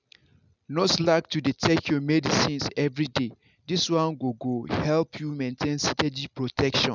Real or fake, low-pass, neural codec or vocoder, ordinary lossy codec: real; 7.2 kHz; none; none